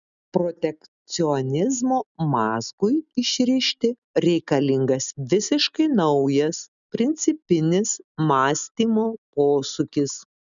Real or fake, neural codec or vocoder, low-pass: real; none; 7.2 kHz